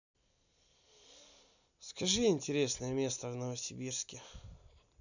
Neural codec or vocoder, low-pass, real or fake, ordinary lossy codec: none; 7.2 kHz; real; none